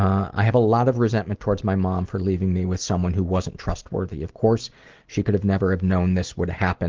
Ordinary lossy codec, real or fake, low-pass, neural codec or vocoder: Opus, 16 kbps; real; 7.2 kHz; none